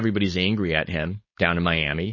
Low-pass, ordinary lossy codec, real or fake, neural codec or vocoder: 7.2 kHz; MP3, 32 kbps; fake; codec, 16 kHz, 4.8 kbps, FACodec